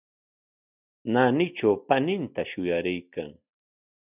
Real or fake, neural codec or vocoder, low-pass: real; none; 3.6 kHz